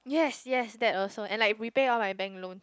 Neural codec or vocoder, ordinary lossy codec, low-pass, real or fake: none; none; none; real